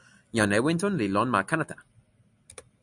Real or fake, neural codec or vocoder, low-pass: real; none; 10.8 kHz